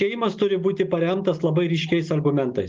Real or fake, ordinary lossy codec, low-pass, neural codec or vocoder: real; Opus, 32 kbps; 7.2 kHz; none